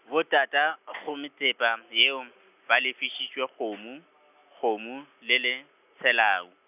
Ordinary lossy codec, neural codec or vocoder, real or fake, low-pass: none; none; real; 3.6 kHz